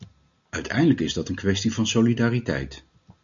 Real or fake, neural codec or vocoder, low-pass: real; none; 7.2 kHz